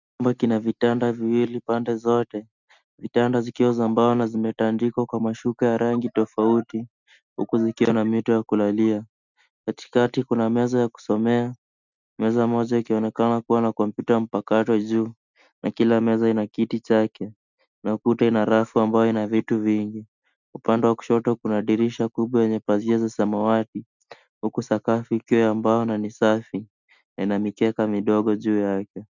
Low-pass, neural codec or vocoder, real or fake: 7.2 kHz; none; real